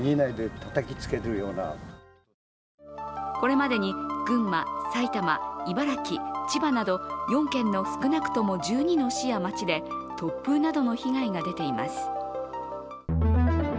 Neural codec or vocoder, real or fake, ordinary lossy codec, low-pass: none; real; none; none